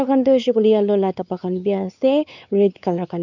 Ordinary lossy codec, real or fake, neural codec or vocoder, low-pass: none; fake; codec, 16 kHz, 4 kbps, X-Codec, WavLM features, trained on Multilingual LibriSpeech; 7.2 kHz